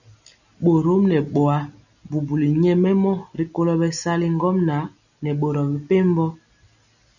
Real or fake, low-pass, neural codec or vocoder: real; 7.2 kHz; none